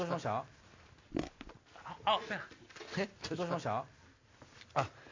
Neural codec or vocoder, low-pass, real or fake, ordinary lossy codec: none; 7.2 kHz; real; MP3, 48 kbps